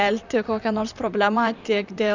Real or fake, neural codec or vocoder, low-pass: fake; vocoder, 44.1 kHz, 128 mel bands, Pupu-Vocoder; 7.2 kHz